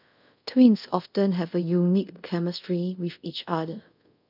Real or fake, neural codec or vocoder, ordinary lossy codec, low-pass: fake; codec, 24 kHz, 0.5 kbps, DualCodec; none; 5.4 kHz